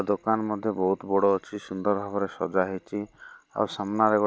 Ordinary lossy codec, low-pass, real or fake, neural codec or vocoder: none; none; real; none